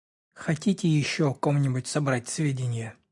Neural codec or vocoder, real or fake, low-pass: none; real; 10.8 kHz